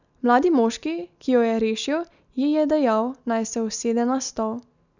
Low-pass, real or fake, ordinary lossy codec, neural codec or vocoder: 7.2 kHz; real; none; none